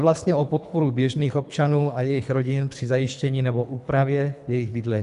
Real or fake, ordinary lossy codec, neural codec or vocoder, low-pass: fake; MP3, 96 kbps; codec, 24 kHz, 3 kbps, HILCodec; 10.8 kHz